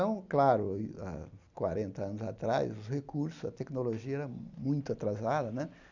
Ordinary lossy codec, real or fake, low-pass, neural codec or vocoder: none; real; 7.2 kHz; none